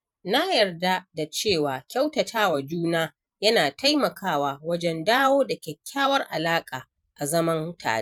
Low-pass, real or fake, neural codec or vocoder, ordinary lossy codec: 19.8 kHz; fake; vocoder, 44.1 kHz, 128 mel bands every 512 samples, BigVGAN v2; none